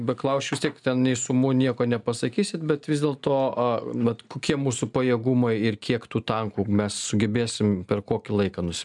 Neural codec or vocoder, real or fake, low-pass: none; real; 10.8 kHz